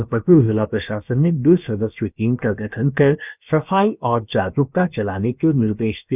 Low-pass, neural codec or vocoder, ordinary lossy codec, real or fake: 3.6 kHz; codec, 16 kHz, 0.7 kbps, FocalCodec; none; fake